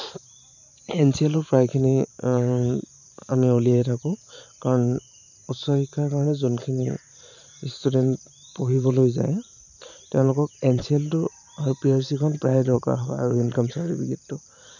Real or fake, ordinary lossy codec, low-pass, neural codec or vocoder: fake; none; 7.2 kHz; vocoder, 44.1 kHz, 128 mel bands every 512 samples, BigVGAN v2